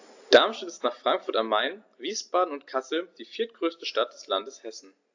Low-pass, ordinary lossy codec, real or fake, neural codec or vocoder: 7.2 kHz; AAC, 48 kbps; real; none